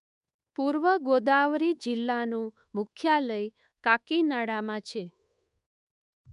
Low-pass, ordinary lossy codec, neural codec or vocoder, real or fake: 10.8 kHz; MP3, 96 kbps; codec, 24 kHz, 1.2 kbps, DualCodec; fake